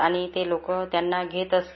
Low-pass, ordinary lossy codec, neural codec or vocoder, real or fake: 7.2 kHz; MP3, 24 kbps; none; real